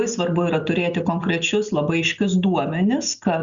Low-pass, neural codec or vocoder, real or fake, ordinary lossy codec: 7.2 kHz; none; real; Opus, 64 kbps